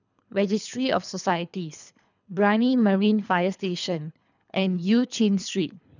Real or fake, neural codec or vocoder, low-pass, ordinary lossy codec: fake; codec, 24 kHz, 3 kbps, HILCodec; 7.2 kHz; none